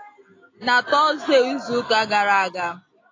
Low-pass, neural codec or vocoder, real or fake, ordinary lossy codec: 7.2 kHz; none; real; AAC, 32 kbps